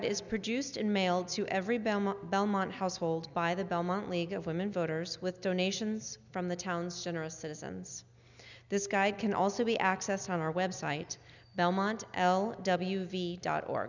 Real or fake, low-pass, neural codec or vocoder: real; 7.2 kHz; none